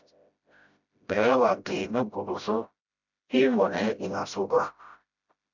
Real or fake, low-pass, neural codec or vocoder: fake; 7.2 kHz; codec, 16 kHz, 0.5 kbps, FreqCodec, smaller model